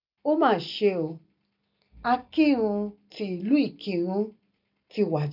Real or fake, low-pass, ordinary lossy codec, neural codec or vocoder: real; 5.4 kHz; none; none